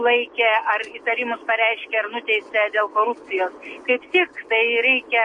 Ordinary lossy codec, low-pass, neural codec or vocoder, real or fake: MP3, 48 kbps; 10.8 kHz; none; real